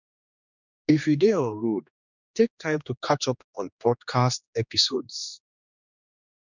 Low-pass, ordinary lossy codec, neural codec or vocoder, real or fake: 7.2 kHz; none; codec, 16 kHz, 2 kbps, X-Codec, HuBERT features, trained on general audio; fake